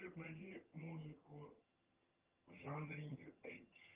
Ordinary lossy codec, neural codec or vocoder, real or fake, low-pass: Opus, 32 kbps; vocoder, 22.05 kHz, 80 mel bands, HiFi-GAN; fake; 3.6 kHz